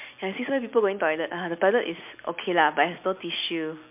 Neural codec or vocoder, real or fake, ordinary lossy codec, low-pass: none; real; MP3, 32 kbps; 3.6 kHz